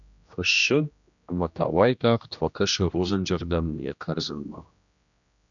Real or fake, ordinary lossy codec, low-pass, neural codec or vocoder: fake; MP3, 96 kbps; 7.2 kHz; codec, 16 kHz, 1 kbps, X-Codec, HuBERT features, trained on general audio